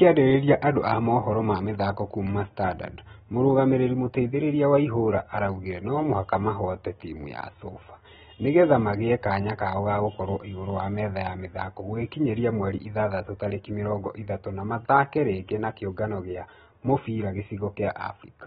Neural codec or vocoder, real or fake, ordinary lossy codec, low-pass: none; real; AAC, 16 kbps; 7.2 kHz